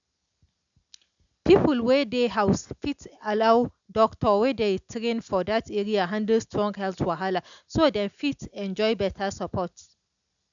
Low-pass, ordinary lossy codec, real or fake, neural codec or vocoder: 7.2 kHz; none; real; none